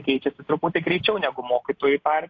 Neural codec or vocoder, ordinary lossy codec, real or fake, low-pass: none; AAC, 48 kbps; real; 7.2 kHz